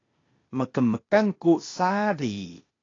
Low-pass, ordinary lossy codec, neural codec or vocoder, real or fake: 7.2 kHz; AAC, 32 kbps; codec, 16 kHz, 0.8 kbps, ZipCodec; fake